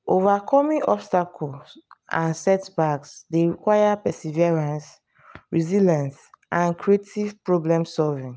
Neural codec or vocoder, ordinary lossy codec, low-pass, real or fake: none; none; none; real